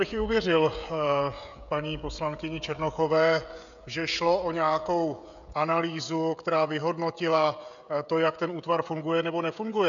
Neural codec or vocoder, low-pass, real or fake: codec, 16 kHz, 16 kbps, FreqCodec, smaller model; 7.2 kHz; fake